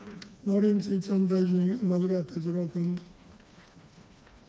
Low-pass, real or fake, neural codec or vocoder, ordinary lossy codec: none; fake; codec, 16 kHz, 2 kbps, FreqCodec, smaller model; none